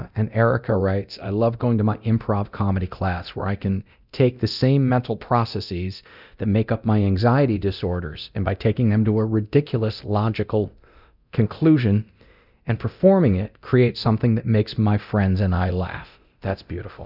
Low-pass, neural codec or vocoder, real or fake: 5.4 kHz; codec, 24 kHz, 0.9 kbps, DualCodec; fake